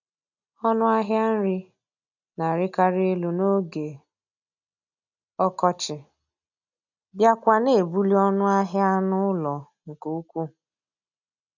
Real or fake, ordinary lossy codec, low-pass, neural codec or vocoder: real; none; 7.2 kHz; none